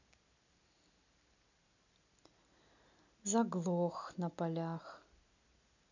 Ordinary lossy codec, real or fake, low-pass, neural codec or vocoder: none; real; 7.2 kHz; none